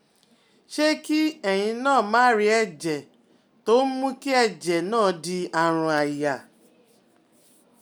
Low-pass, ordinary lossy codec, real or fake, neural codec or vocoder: none; none; real; none